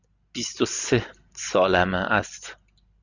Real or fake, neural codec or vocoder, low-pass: real; none; 7.2 kHz